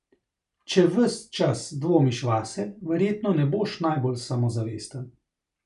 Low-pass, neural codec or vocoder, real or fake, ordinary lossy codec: 10.8 kHz; none; real; none